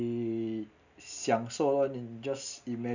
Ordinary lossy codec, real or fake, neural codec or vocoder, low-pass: none; real; none; 7.2 kHz